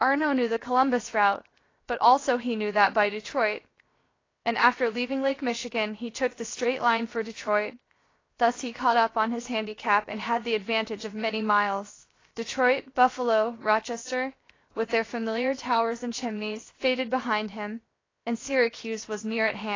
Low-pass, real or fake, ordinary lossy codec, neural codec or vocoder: 7.2 kHz; fake; AAC, 32 kbps; codec, 16 kHz, 0.7 kbps, FocalCodec